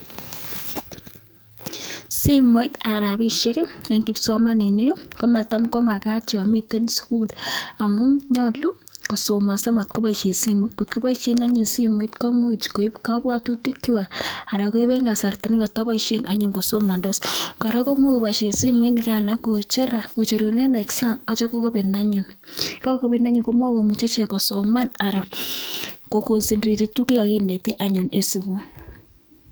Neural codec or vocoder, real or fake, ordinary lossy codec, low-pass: codec, 44.1 kHz, 2.6 kbps, SNAC; fake; none; none